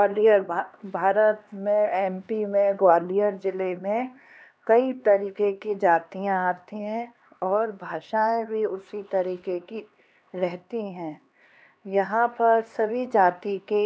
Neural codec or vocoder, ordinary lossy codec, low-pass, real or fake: codec, 16 kHz, 2 kbps, X-Codec, HuBERT features, trained on LibriSpeech; none; none; fake